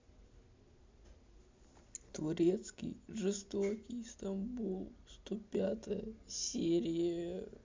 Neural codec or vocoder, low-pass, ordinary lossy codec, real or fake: none; 7.2 kHz; MP3, 64 kbps; real